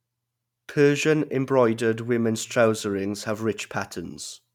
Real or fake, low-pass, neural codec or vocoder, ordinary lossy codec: real; 19.8 kHz; none; none